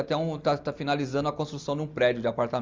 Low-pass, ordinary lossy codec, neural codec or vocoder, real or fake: 7.2 kHz; Opus, 32 kbps; none; real